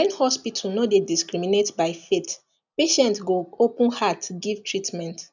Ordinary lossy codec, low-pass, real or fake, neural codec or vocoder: none; 7.2 kHz; real; none